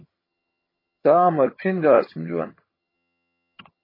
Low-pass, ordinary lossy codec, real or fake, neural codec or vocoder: 5.4 kHz; MP3, 24 kbps; fake; vocoder, 22.05 kHz, 80 mel bands, HiFi-GAN